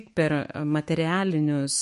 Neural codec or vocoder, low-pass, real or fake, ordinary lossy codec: autoencoder, 48 kHz, 128 numbers a frame, DAC-VAE, trained on Japanese speech; 14.4 kHz; fake; MP3, 48 kbps